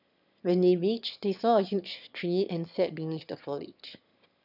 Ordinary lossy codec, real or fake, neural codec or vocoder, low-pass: none; fake; autoencoder, 22.05 kHz, a latent of 192 numbers a frame, VITS, trained on one speaker; 5.4 kHz